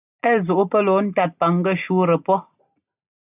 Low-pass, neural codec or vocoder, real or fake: 3.6 kHz; none; real